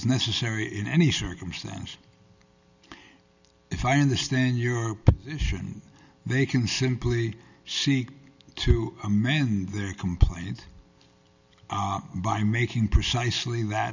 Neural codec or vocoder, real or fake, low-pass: none; real; 7.2 kHz